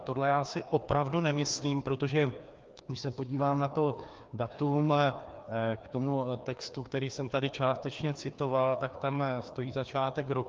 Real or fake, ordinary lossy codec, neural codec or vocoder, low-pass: fake; Opus, 32 kbps; codec, 16 kHz, 2 kbps, FreqCodec, larger model; 7.2 kHz